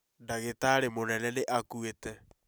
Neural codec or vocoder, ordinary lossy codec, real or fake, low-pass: none; none; real; none